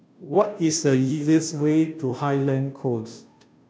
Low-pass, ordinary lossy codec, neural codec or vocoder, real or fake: none; none; codec, 16 kHz, 0.5 kbps, FunCodec, trained on Chinese and English, 25 frames a second; fake